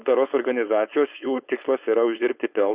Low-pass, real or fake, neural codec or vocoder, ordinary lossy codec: 3.6 kHz; fake; codec, 16 kHz, 4.8 kbps, FACodec; Opus, 64 kbps